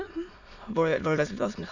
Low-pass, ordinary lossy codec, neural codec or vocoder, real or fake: 7.2 kHz; none; autoencoder, 22.05 kHz, a latent of 192 numbers a frame, VITS, trained on many speakers; fake